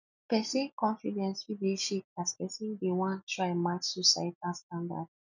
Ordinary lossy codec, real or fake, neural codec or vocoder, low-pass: none; real; none; 7.2 kHz